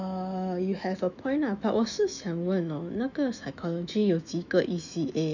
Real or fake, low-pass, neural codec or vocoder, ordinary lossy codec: fake; 7.2 kHz; autoencoder, 48 kHz, 128 numbers a frame, DAC-VAE, trained on Japanese speech; none